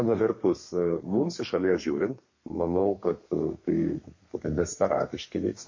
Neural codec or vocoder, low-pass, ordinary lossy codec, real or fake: codec, 32 kHz, 1.9 kbps, SNAC; 7.2 kHz; MP3, 32 kbps; fake